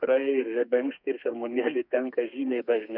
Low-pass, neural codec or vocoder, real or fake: 5.4 kHz; codec, 32 kHz, 1.9 kbps, SNAC; fake